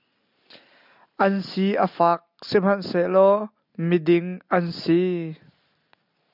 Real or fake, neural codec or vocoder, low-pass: real; none; 5.4 kHz